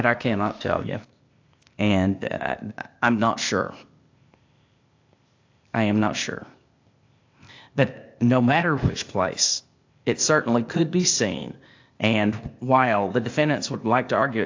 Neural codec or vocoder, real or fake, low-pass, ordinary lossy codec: codec, 16 kHz, 0.8 kbps, ZipCodec; fake; 7.2 kHz; AAC, 48 kbps